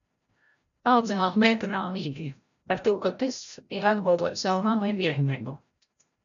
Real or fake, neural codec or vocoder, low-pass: fake; codec, 16 kHz, 0.5 kbps, FreqCodec, larger model; 7.2 kHz